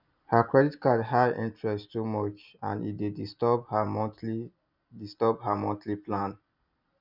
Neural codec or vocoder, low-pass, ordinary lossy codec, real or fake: none; 5.4 kHz; none; real